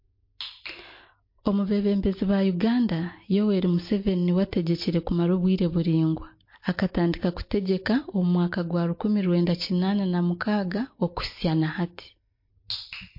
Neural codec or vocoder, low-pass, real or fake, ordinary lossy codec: none; 5.4 kHz; real; MP3, 32 kbps